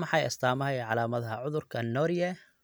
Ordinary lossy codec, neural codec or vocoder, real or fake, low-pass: none; none; real; none